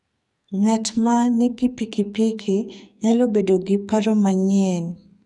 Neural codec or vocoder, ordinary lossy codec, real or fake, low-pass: codec, 44.1 kHz, 2.6 kbps, SNAC; none; fake; 10.8 kHz